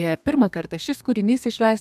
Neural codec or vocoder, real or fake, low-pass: codec, 32 kHz, 1.9 kbps, SNAC; fake; 14.4 kHz